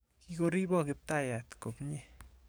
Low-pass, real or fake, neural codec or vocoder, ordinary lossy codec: none; fake; codec, 44.1 kHz, 7.8 kbps, DAC; none